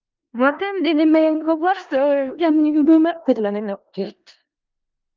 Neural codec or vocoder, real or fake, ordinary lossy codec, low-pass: codec, 16 kHz in and 24 kHz out, 0.4 kbps, LongCat-Audio-Codec, four codebook decoder; fake; Opus, 32 kbps; 7.2 kHz